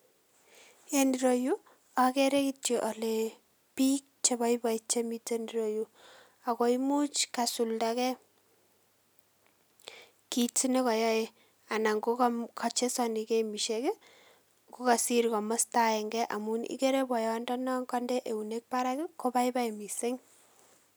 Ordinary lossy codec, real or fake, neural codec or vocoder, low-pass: none; real; none; none